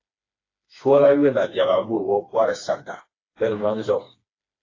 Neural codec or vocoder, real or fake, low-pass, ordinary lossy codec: codec, 16 kHz, 2 kbps, FreqCodec, smaller model; fake; 7.2 kHz; AAC, 32 kbps